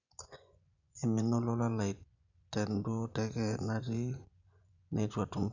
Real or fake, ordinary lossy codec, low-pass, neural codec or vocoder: fake; none; 7.2 kHz; vocoder, 24 kHz, 100 mel bands, Vocos